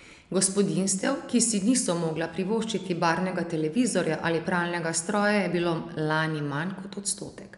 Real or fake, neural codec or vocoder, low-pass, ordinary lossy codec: real; none; 10.8 kHz; none